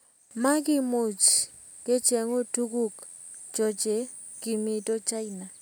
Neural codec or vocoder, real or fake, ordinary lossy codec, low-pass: none; real; none; none